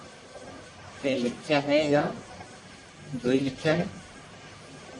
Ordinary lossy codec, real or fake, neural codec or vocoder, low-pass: Opus, 64 kbps; fake; codec, 44.1 kHz, 1.7 kbps, Pupu-Codec; 10.8 kHz